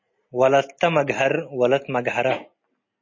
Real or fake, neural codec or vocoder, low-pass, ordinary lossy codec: real; none; 7.2 kHz; MP3, 32 kbps